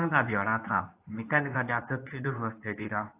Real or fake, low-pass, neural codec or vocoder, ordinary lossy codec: fake; 3.6 kHz; codec, 24 kHz, 0.9 kbps, WavTokenizer, medium speech release version 2; AAC, 24 kbps